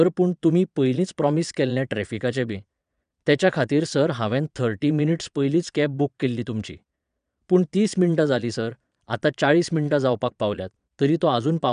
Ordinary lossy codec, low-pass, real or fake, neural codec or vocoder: none; 9.9 kHz; fake; vocoder, 22.05 kHz, 80 mel bands, Vocos